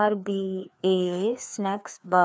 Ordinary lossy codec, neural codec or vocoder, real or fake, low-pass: none; codec, 16 kHz, 2 kbps, FreqCodec, larger model; fake; none